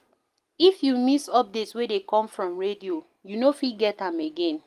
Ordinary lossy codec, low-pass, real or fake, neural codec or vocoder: Opus, 32 kbps; 14.4 kHz; real; none